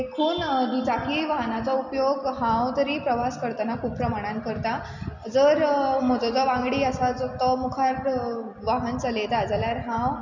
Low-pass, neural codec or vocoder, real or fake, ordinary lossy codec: 7.2 kHz; none; real; none